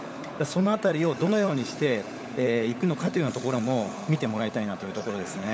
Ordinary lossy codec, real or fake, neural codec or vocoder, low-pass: none; fake; codec, 16 kHz, 16 kbps, FunCodec, trained on LibriTTS, 50 frames a second; none